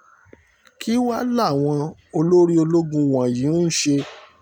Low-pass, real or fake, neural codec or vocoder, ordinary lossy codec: 19.8 kHz; real; none; none